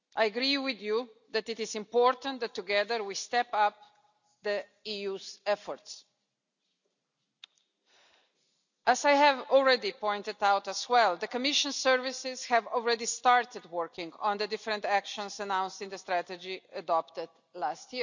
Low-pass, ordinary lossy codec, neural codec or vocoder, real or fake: 7.2 kHz; none; none; real